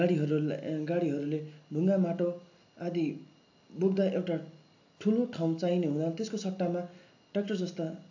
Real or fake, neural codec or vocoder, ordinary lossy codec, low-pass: real; none; AAC, 48 kbps; 7.2 kHz